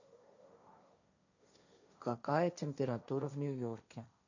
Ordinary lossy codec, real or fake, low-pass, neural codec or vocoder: none; fake; 7.2 kHz; codec, 16 kHz, 1.1 kbps, Voila-Tokenizer